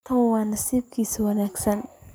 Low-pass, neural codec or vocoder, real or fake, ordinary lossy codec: none; vocoder, 44.1 kHz, 128 mel bands every 256 samples, BigVGAN v2; fake; none